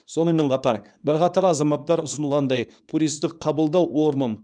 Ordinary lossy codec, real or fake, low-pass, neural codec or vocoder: none; fake; 9.9 kHz; codec, 24 kHz, 0.9 kbps, WavTokenizer, small release